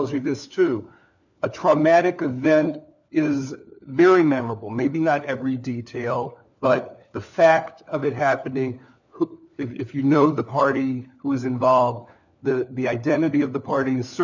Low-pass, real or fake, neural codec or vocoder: 7.2 kHz; fake; codec, 16 kHz, 4 kbps, FunCodec, trained on LibriTTS, 50 frames a second